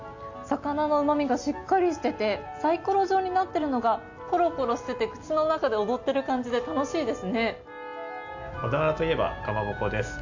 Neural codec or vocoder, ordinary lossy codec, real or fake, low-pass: none; AAC, 48 kbps; real; 7.2 kHz